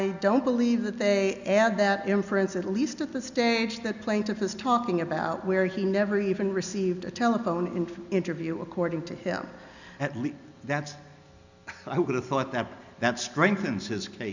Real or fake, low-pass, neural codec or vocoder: real; 7.2 kHz; none